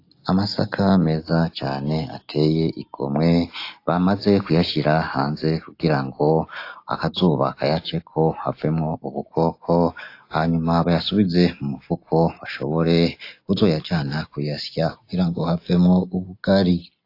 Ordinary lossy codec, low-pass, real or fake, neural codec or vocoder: AAC, 32 kbps; 5.4 kHz; real; none